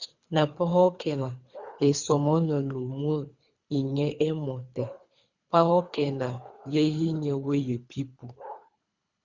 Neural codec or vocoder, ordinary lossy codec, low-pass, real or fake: codec, 24 kHz, 3 kbps, HILCodec; Opus, 64 kbps; 7.2 kHz; fake